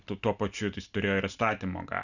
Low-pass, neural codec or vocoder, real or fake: 7.2 kHz; none; real